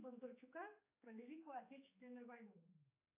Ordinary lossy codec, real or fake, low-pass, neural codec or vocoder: AAC, 24 kbps; fake; 3.6 kHz; codec, 16 kHz, 4 kbps, X-Codec, HuBERT features, trained on general audio